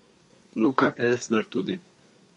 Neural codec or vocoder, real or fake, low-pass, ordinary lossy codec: codec, 24 kHz, 1 kbps, SNAC; fake; 10.8 kHz; MP3, 48 kbps